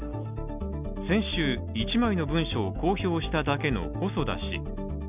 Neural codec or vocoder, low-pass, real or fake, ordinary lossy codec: none; 3.6 kHz; real; none